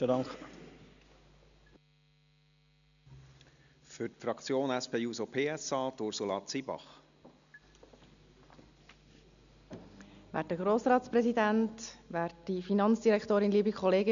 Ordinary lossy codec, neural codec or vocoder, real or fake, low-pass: none; none; real; 7.2 kHz